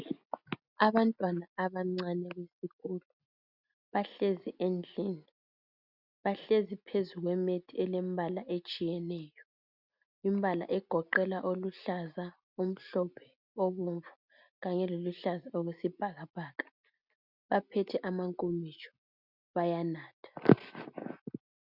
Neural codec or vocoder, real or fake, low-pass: none; real; 5.4 kHz